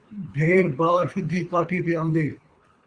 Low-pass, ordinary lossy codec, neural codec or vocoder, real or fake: 9.9 kHz; Opus, 64 kbps; codec, 24 kHz, 3 kbps, HILCodec; fake